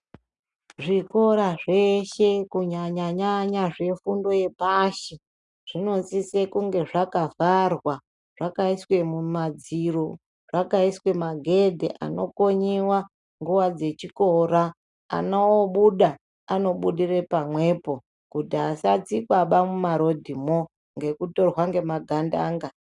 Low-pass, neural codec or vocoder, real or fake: 10.8 kHz; none; real